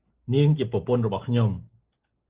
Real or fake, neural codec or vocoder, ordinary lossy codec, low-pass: real; none; Opus, 16 kbps; 3.6 kHz